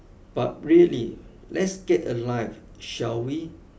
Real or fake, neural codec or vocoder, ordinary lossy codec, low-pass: real; none; none; none